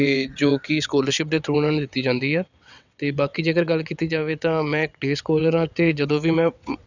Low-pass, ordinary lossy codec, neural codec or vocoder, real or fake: 7.2 kHz; none; vocoder, 22.05 kHz, 80 mel bands, WaveNeXt; fake